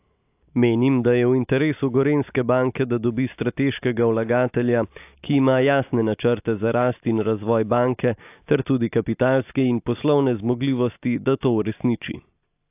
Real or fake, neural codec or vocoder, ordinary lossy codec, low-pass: real; none; AAC, 32 kbps; 3.6 kHz